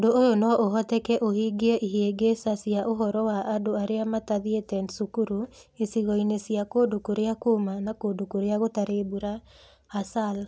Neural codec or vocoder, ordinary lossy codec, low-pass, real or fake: none; none; none; real